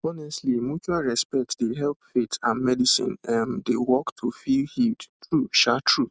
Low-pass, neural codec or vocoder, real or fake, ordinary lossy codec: none; none; real; none